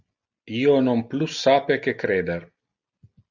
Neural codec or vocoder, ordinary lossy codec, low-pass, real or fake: none; Opus, 64 kbps; 7.2 kHz; real